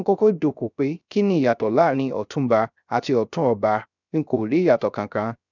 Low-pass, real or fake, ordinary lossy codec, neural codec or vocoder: 7.2 kHz; fake; none; codec, 16 kHz, 0.3 kbps, FocalCodec